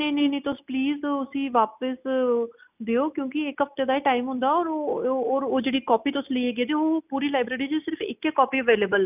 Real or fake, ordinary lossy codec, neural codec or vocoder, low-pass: real; none; none; 3.6 kHz